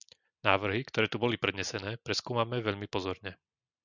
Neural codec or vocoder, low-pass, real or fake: none; 7.2 kHz; real